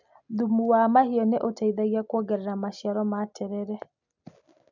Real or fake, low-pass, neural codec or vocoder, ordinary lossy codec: real; 7.2 kHz; none; none